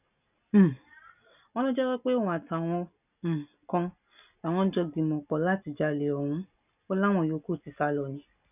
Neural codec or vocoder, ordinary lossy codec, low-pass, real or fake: none; none; 3.6 kHz; real